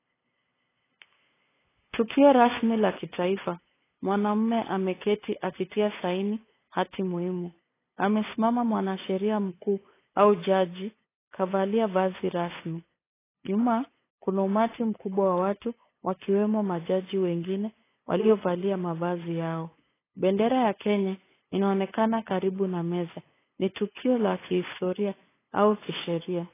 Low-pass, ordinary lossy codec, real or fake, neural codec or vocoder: 3.6 kHz; AAC, 16 kbps; fake; codec, 16 kHz, 8 kbps, FunCodec, trained on LibriTTS, 25 frames a second